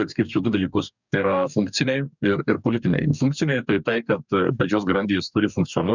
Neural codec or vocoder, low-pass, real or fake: codec, 44.1 kHz, 2.6 kbps, DAC; 7.2 kHz; fake